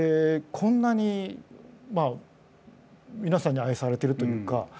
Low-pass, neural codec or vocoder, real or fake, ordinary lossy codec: none; none; real; none